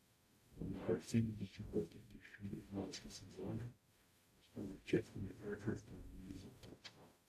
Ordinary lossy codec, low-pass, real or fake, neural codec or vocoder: AAC, 64 kbps; 14.4 kHz; fake; codec, 44.1 kHz, 0.9 kbps, DAC